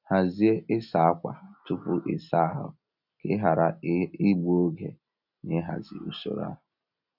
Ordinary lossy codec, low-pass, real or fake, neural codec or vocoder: none; 5.4 kHz; real; none